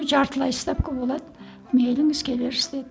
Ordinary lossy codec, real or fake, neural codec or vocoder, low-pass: none; real; none; none